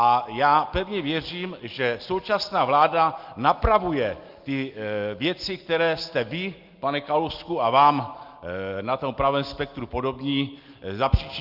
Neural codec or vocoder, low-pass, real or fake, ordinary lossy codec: none; 5.4 kHz; real; Opus, 32 kbps